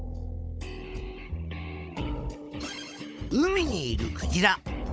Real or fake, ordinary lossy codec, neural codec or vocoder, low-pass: fake; none; codec, 16 kHz, 16 kbps, FunCodec, trained on Chinese and English, 50 frames a second; none